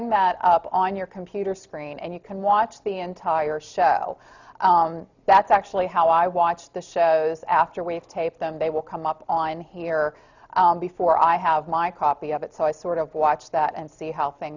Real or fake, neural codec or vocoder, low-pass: real; none; 7.2 kHz